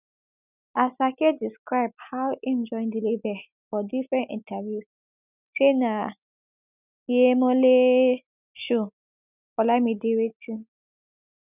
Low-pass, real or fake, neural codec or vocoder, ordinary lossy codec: 3.6 kHz; real; none; none